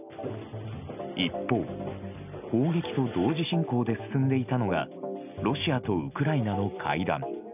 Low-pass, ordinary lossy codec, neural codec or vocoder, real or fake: 3.6 kHz; none; none; real